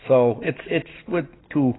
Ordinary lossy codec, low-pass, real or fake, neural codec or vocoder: AAC, 16 kbps; 7.2 kHz; real; none